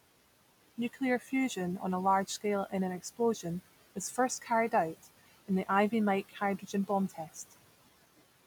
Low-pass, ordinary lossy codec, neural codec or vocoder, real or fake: none; none; none; real